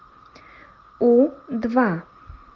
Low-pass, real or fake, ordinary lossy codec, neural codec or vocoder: 7.2 kHz; real; Opus, 24 kbps; none